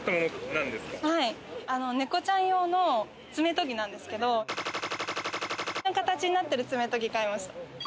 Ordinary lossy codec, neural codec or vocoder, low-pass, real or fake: none; none; none; real